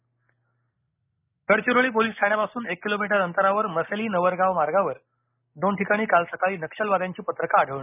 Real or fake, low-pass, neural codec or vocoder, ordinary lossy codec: real; 3.6 kHz; none; none